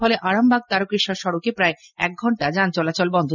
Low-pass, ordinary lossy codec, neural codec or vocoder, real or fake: 7.2 kHz; none; none; real